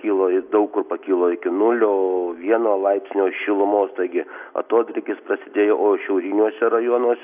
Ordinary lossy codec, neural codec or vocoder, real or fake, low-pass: AAC, 32 kbps; none; real; 3.6 kHz